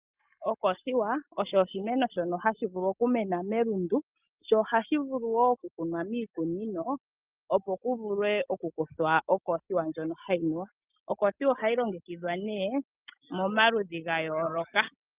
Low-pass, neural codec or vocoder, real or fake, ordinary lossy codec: 3.6 kHz; none; real; Opus, 24 kbps